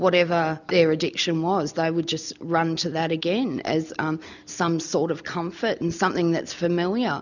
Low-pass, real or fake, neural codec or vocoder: 7.2 kHz; real; none